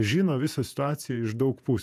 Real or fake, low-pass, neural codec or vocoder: fake; 14.4 kHz; vocoder, 48 kHz, 128 mel bands, Vocos